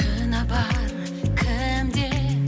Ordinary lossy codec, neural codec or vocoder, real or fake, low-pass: none; none; real; none